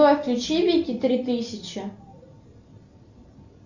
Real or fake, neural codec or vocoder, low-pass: real; none; 7.2 kHz